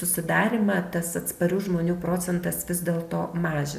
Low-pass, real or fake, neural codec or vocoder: 14.4 kHz; real; none